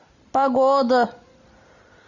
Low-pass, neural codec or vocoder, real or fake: 7.2 kHz; none; real